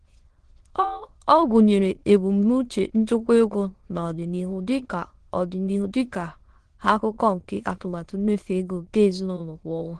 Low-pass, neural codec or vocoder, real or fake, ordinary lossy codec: 9.9 kHz; autoencoder, 22.05 kHz, a latent of 192 numbers a frame, VITS, trained on many speakers; fake; Opus, 16 kbps